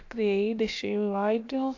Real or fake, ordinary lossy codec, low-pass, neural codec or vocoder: fake; AAC, 48 kbps; 7.2 kHz; codec, 16 kHz, about 1 kbps, DyCAST, with the encoder's durations